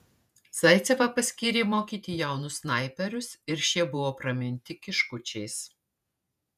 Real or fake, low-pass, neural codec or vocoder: fake; 14.4 kHz; vocoder, 48 kHz, 128 mel bands, Vocos